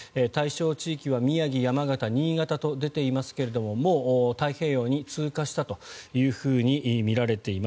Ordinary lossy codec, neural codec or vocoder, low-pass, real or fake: none; none; none; real